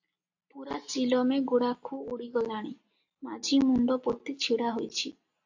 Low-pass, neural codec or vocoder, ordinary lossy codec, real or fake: 7.2 kHz; none; AAC, 48 kbps; real